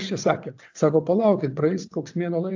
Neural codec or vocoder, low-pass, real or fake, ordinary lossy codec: vocoder, 22.05 kHz, 80 mel bands, WaveNeXt; 7.2 kHz; fake; AAC, 48 kbps